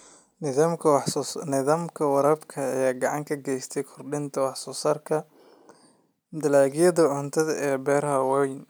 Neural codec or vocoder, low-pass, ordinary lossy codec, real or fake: none; none; none; real